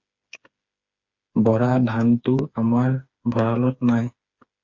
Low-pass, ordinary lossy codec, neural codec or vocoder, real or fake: 7.2 kHz; Opus, 64 kbps; codec, 16 kHz, 4 kbps, FreqCodec, smaller model; fake